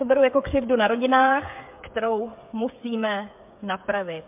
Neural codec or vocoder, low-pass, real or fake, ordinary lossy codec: codec, 16 kHz, 8 kbps, FreqCodec, smaller model; 3.6 kHz; fake; MP3, 32 kbps